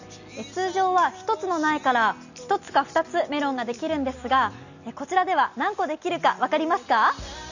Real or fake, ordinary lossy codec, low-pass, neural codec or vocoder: real; none; 7.2 kHz; none